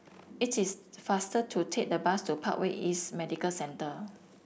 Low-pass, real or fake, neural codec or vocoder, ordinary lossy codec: none; real; none; none